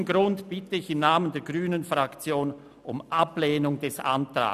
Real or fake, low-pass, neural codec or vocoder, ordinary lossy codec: real; 14.4 kHz; none; none